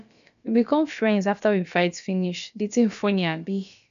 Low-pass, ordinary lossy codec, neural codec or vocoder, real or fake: 7.2 kHz; none; codec, 16 kHz, about 1 kbps, DyCAST, with the encoder's durations; fake